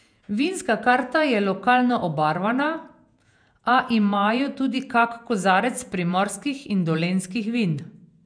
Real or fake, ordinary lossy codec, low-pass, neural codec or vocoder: fake; none; 9.9 kHz; vocoder, 48 kHz, 128 mel bands, Vocos